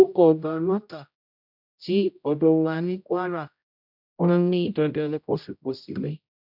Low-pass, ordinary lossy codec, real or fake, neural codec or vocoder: 5.4 kHz; none; fake; codec, 16 kHz, 0.5 kbps, X-Codec, HuBERT features, trained on general audio